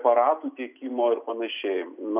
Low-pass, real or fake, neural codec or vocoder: 3.6 kHz; real; none